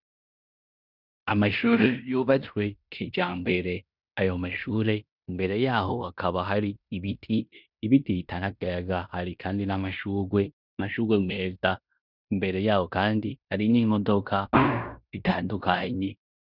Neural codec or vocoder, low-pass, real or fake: codec, 16 kHz in and 24 kHz out, 0.9 kbps, LongCat-Audio-Codec, fine tuned four codebook decoder; 5.4 kHz; fake